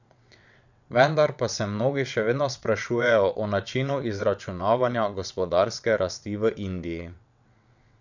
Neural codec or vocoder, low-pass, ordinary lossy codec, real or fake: vocoder, 22.05 kHz, 80 mel bands, WaveNeXt; 7.2 kHz; none; fake